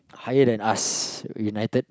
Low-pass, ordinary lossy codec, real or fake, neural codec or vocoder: none; none; real; none